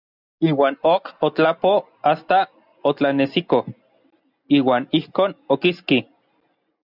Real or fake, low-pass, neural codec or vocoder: real; 5.4 kHz; none